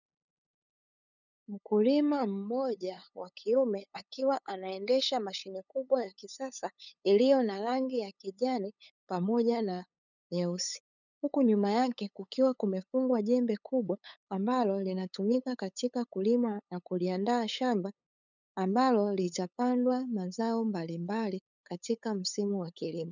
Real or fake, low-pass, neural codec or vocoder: fake; 7.2 kHz; codec, 16 kHz, 8 kbps, FunCodec, trained on LibriTTS, 25 frames a second